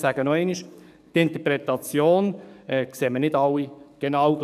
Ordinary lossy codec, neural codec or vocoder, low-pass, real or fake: none; codec, 44.1 kHz, 7.8 kbps, DAC; 14.4 kHz; fake